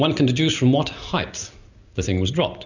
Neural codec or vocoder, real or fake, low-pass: none; real; 7.2 kHz